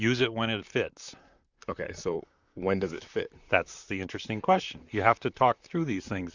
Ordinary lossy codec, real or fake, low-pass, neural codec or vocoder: Opus, 64 kbps; fake; 7.2 kHz; vocoder, 44.1 kHz, 128 mel bands, Pupu-Vocoder